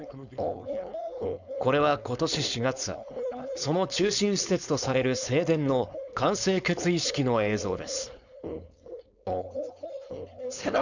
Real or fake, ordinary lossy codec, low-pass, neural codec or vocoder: fake; none; 7.2 kHz; codec, 16 kHz, 4.8 kbps, FACodec